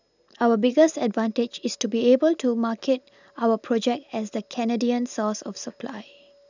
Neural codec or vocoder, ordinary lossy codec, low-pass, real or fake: none; none; 7.2 kHz; real